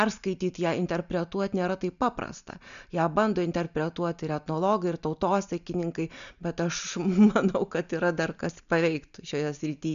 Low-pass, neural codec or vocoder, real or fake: 7.2 kHz; none; real